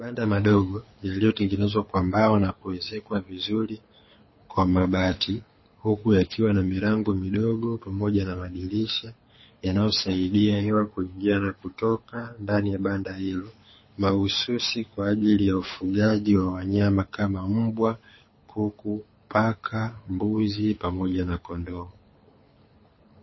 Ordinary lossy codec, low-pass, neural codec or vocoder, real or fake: MP3, 24 kbps; 7.2 kHz; codec, 24 kHz, 6 kbps, HILCodec; fake